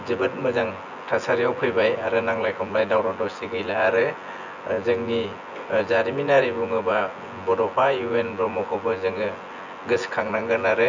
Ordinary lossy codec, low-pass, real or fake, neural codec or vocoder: none; 7.2 kHz; fake; vocoder, 24 kHz, 100 mel bands, Vocos